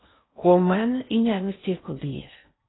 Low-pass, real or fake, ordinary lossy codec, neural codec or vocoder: 7.2 kHz; fake; AAC, 16 kbps; codec, 16 kHz in and 24 kHz out, 0.6 kbps, FocalCodec, streaming, 4096 codes